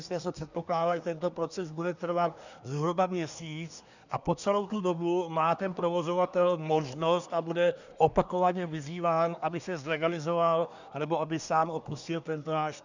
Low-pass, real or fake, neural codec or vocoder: 7.2 kHz; fake; codec, 24 kHz, 1 kbps, SNAC